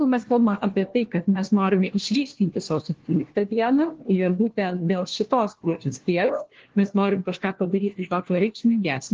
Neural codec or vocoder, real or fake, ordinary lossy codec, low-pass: codec, 16 kHz, 1 kbps, FunCodec, trained on LibriTTS, 50 frames a second; fake; Opus, 16 kbps; 7.2 kHz